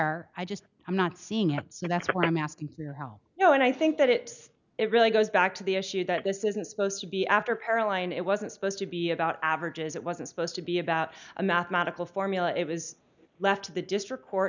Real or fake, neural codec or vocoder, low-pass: real; none; 7.2 kHz